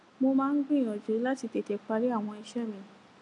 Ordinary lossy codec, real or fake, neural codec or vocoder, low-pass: none; real; none; 10.8 kHz